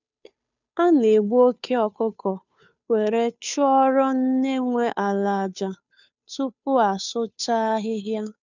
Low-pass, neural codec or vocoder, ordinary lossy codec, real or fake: 7.2 kHz; codec, 16 kHz, 2 kbps, FunCodec, trained on Chinese and English, 25 frames a second; none; fake